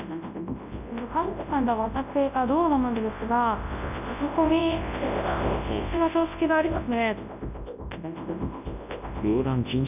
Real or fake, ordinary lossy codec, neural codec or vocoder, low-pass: fake; none; codec, 24 kHz, 0.9 kbps, WavTokenizer, large speech release; 3.6 kHz